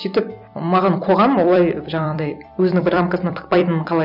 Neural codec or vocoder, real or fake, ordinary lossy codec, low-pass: vocoder, 44.1 kHz, 128 mel bands every 256 samples, BigVGAN v2; fake; none; 5.4 kHz